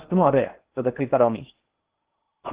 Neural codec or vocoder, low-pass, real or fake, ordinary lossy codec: codec, 16 kHz in and 24 kHz out, 0.8 kbps, FocalCodec, streaming, 65536 codes; 3.6 kHz; fake; Opus, 16 kbps